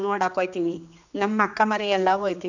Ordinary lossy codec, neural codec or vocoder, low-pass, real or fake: none; codec, 16 kHz, 2 kbps, X-Codec, HuBERT features, trained on general audio; 7.2 kHz; fake